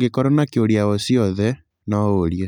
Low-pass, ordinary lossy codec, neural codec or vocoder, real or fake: 19.8 kHz; none; none; real